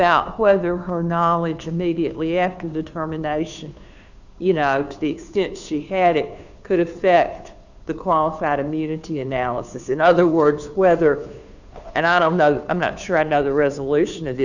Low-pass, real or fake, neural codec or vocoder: 7.2 kHz; fake; codec, 16 kHz, 2 kbps, FunCodec, trained on Chinese and English, 25 frames a second